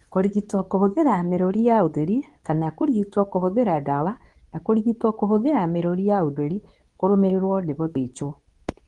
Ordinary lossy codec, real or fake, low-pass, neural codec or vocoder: Opus, 24 kbps; fake; 10.8 kHz; codec, 24 kHz, 0.9 kbps, WavTokenizer, medium speech release version 2